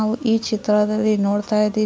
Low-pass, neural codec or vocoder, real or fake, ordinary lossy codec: none; none; real; none